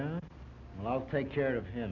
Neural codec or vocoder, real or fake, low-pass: none; real; 7.2 kHz